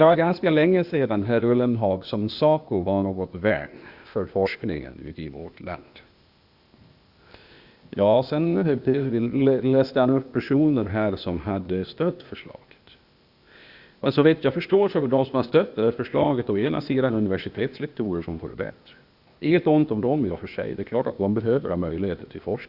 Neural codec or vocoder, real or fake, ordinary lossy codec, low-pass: codec, 16 kHz, 0.8 kbps, ZipCodec; fake; Opus, 64 kbps; 5.4 kHz